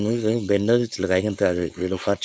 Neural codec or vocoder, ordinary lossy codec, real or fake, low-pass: codec, 16 kHz, 4.8 kbps, FACodec; none; fake; none